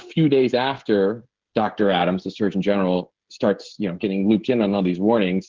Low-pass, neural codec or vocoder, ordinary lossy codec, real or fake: 7.2 kHz; codec, 16 kHz, 16 kbps, FreqCodec, smaller model; Opus, 16 kbps; fake